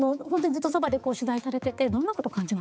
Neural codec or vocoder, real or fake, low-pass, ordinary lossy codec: codec, 16 kHz, 4 kbps, X-Codec, HuBERT features, trained on balanced general audio; fake; none; none